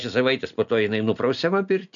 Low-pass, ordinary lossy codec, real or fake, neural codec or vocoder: 7.2 kHz; MP3, 96 kbps; real; none